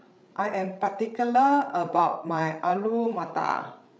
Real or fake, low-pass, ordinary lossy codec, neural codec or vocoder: fake; none; none; codec, 16 kHz, 8 kbps, FreqCodec, larger model